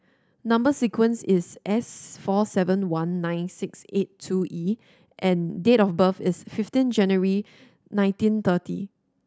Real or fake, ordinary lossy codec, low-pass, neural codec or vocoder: real; none; none; none